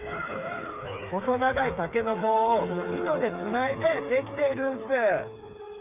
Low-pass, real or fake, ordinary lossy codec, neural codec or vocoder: 3.6 kHz; fake; none; codec, 16 kHz, 4 kbps, FreqCodec, smaller model